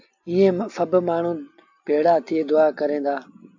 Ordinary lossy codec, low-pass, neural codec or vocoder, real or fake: AAC, 48 kbps; 7.2 kHz; none; real